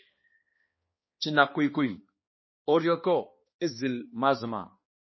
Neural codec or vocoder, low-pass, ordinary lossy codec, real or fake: codec, 16 kHz, 2 kbps, X-Codec, HuBERT features, trained on balanced general audio; 7.2 kHz; MP3, 24 kbps; fake